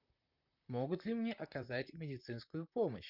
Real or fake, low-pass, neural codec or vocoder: fake; 5.4 kHz; vocoder, 44.1 kHz, 128 mel bands, Pupu-Vocoder